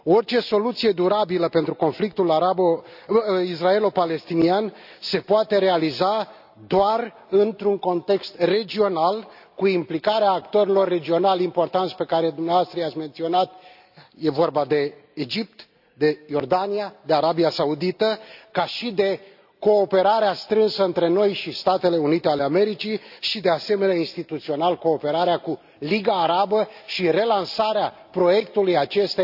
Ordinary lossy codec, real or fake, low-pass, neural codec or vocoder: AAC, 48 kbps; real; 5.4 kHz; none